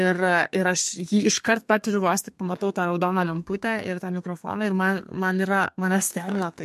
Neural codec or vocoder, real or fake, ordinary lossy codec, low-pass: codec, 32 kHz, 1.9 kbps, SNAC; fake; MP3, 64 kbps; 14.4 kHz